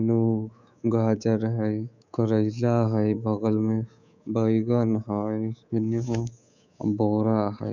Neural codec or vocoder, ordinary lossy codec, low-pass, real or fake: codec, 24 kHz, 3.1 kbps, DualCodec; none; 7.2 kHz; fake